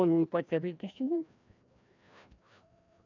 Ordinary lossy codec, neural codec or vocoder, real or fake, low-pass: none; codec, 16 kHz, 1 kbps, FreqCodec, larger model; fake; 7.2 kHz